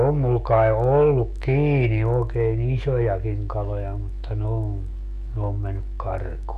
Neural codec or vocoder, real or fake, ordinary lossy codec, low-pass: autoencoder, 48 kHz, 128 numbers a frame, DAC-VAE, trained on Japanese speech; fake; none; 14.4 kHz